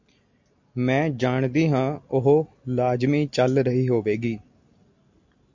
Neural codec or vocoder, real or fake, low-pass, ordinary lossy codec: none; real; 7.2 kHz; MP3, 48 kbps